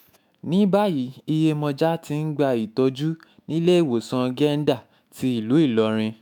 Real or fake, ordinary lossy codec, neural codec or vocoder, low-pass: fake; none; autoencoder, 48 kHz, 128 numbers a frame, DAC-VAE, trained on Japanese speech; none